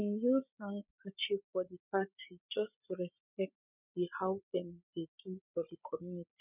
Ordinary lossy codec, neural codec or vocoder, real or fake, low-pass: none; codec, 16 kHz, 8 kbps, FreqCodec, larger model; fake; 3.6 kHz